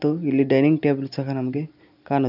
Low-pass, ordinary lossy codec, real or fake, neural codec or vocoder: 5.4 kHz; none; real; none